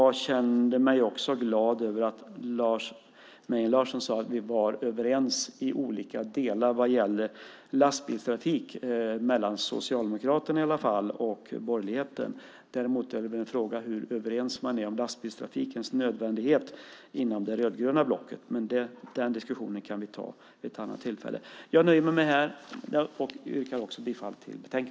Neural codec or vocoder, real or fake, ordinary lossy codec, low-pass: none; real; none; none